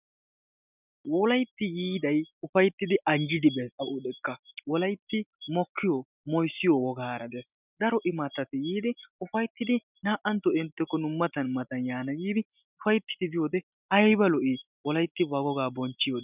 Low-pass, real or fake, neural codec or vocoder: 3.6 kHz; real; none